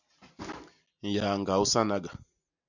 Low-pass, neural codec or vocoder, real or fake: 7.2 kHz; none; real